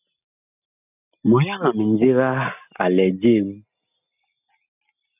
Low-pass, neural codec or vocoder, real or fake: 3.6 kHz; none; real